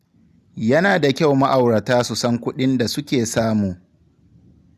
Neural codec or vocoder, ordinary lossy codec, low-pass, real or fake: none; none; 14.4 kHz; real